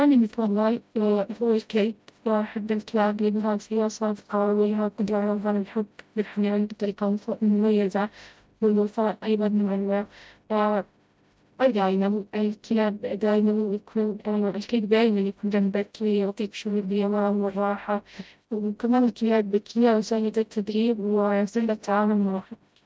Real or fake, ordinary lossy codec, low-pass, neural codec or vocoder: fake; none; none; codec, 16 kHz, 0.5 kbps, FreqCodec, smaller model